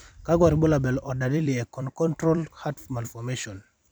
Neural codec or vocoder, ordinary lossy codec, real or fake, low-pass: vocoder, 44.1 kHz, 128 mel bands every 256 samples, BigVGAN v2; none; fake; none